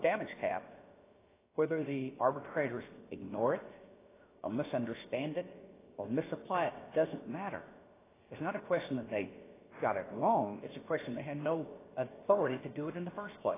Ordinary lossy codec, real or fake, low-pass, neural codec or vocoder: AAC, 16 kbps; fake; 3.6 kHz; codec, 16 kHz, about 1 kbps, DyCAST, with the encoder's durations